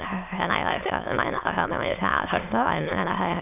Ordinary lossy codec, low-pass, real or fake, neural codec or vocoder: none; 3.6 kHz; fake; autoencoder, 22.05 kHz, a latent of 192 numbers a frame, VITS, trained on many speakers